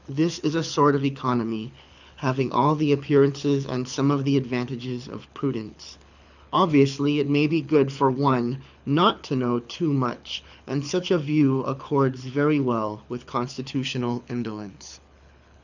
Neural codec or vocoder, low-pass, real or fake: codec, 24 kHz, 6 kbps, HILCodec; 7.2 kHz; fake